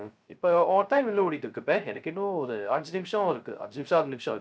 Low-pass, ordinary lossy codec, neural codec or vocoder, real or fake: none; none; codec, 16 kHz, 0.3 kbps, FocalCodec; fake